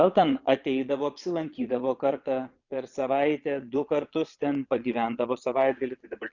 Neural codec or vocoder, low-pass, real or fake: vocoder, 22.05 kHz, 80 mel bands, Vocos; 7.2 kHz; fake